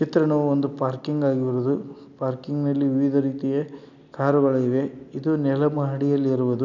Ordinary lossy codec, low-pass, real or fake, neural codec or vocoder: none; 7.2 kHz; real; none